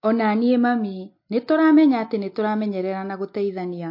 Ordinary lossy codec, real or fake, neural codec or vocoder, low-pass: MP3, 32 kbps; real; none; 5.4 kHz